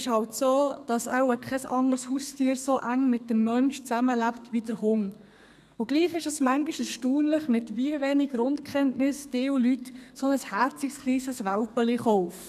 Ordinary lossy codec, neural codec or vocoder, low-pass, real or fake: none; codec, 32 kHz, 1.9 kbps, SNAC; 14.4 kHz; fake